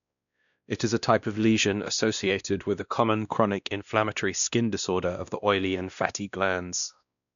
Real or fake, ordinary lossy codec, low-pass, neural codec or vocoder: fake; none; 7.2 kHz; codec, 16 kHz, 1 kbps, X-Codec, WavLM features, trained on Multilingual LibriSpeech